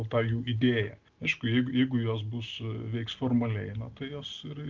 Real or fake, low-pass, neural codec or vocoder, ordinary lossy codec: real; 7.2 kHz; none; Opus, 32 kbps